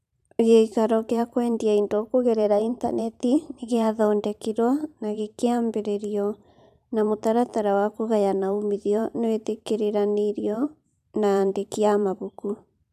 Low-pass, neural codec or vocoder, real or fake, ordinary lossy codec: 14.4 kHz; vocoder, 44.1 kHz, 128 mel bands every 512 samples, BigVGAN v2; fake; none